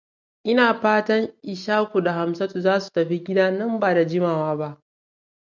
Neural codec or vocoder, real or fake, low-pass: none; real; 7.2 kHz